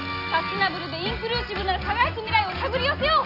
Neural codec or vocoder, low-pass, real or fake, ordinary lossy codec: none; 5.4 kHz; real; none